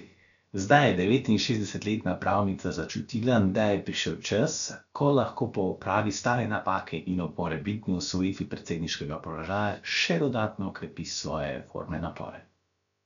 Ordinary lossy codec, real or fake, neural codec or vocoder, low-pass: none; fake; codec, 16 kHz, about 1 kbps, DyCAST, with the encoder's durations; 7.2 kHz